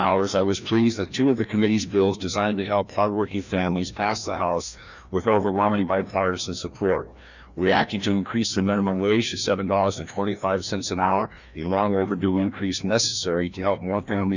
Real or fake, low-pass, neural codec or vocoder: fake; 7.2 kHz; codec, 16 kHz, 1 kbps, FreqCodec, larger model